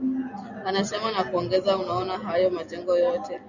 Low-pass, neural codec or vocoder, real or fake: 7.2 kHz; none; real